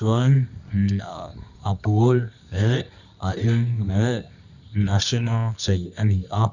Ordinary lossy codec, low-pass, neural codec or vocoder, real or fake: none; 7.2 kHz; codec, 24 kHz, 0.9 kbps, WavTokenizer, medium music audio release; fake